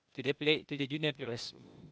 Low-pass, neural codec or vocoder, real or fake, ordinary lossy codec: none; codec, 16 kHz, 0.8 kbps, ZipCodec; fake; none